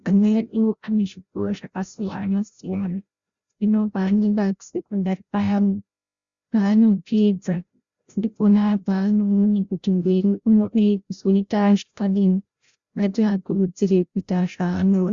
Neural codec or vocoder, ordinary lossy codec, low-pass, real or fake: codec, 16 kHz, 0.5 kbps, FreqCodec, larger model; Opus, 64 kbps; 7.2 kHz; fake